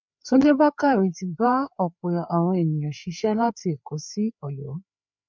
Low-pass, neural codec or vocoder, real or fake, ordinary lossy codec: 7.2 kHz; codec, 16 kHz, 4 kbps, FreqCodec, larger model; fake; MP3, 64 kbps